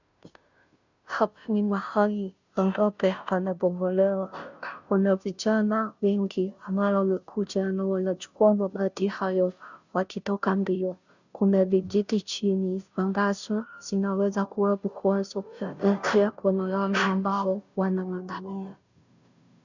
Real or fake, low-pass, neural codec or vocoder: fake; 7.2 kHz; codec, 16 kHz, 0.5 kbps, FunCodec, trained on Chinese and English, 25 frames a second